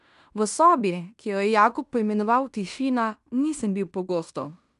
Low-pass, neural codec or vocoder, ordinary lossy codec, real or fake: 10.8 kHz; codec, 16 kHz in and 24 kHz out, 0.9 kbps, LongCat-Audio-Codec, fine tuned four codebook decoder; none; fake